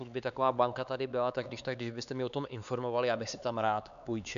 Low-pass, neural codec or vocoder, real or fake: 7.2 kHz; codec, 16 kHz, 4 kbps, X-Codec, HuBERT features, trained on LibriSpeech; fake